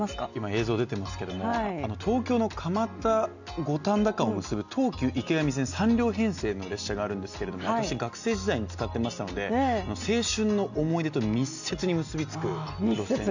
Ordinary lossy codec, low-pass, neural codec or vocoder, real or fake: none; 7.2 kHz; none; real